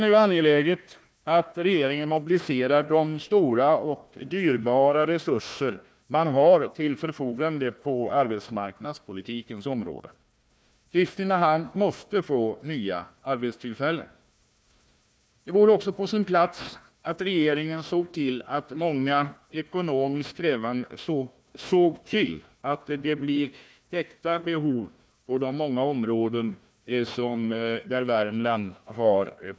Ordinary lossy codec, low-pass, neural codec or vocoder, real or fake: none; none; codec, 16 kHz, 1 kbps, FunCodec, trained on Chinese and English, 50 frames a second; fake